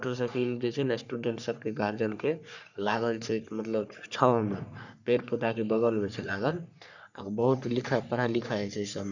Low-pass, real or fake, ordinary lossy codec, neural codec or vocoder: 7.2 kHz; fake; none; codec, 44.1 kHz, 3.4 kbps, Pupu-Codec